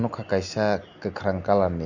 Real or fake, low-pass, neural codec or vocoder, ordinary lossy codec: real; 7.2 kHz; none; none